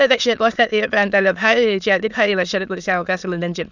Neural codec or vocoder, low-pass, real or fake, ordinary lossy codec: autoencoder, 22.05 kHz, a latent of 192 numbers a frame, VITS, trained on many speakers; 7.2 kHz; fake; none